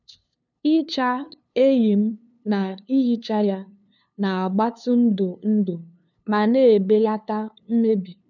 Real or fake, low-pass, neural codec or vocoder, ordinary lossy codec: fake; 7.2 kHz; codec, 16 kHz, 2 kbps, FunCodec, trained on LibriTTS, 25 frames a second; none